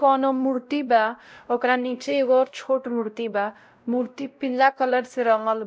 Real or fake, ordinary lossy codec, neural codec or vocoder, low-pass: fake; none; codec, 16 kHz, 0.5 kbps, X-Codec, WavLM features, trained on Multilingual LibriSpeech; none